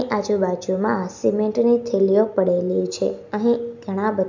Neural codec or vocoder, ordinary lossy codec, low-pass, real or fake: none; none; 7.2 kHz; real